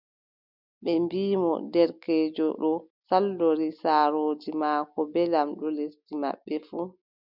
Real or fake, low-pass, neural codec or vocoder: real; 5.4 kHz; none